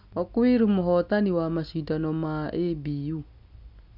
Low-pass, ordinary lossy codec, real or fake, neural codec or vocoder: 5.4 kHz; none; real; none